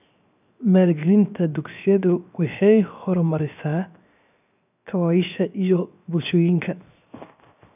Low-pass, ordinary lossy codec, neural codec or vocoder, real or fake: 3.6 kHz; none; codec, 16 kHz, 0.7 kbps, FocalCodec; fake